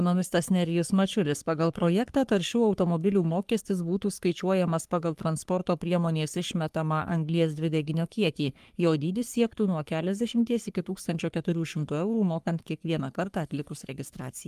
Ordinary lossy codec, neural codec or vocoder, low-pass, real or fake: Opus, 32 kbps; codec, 44.1 kHz, 3.4 kbps, Pupu-Codec; 14.4 kHz; fake